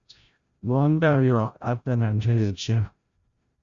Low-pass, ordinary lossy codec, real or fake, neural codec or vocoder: 7.2 kHz; Opus, 64 kbps; fake; codec, 16 kHz, 0.5 kbps, FreqCodec, larger model